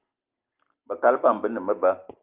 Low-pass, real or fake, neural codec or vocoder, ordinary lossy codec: 3.6 kHz; real; none; Opus, 24 kbps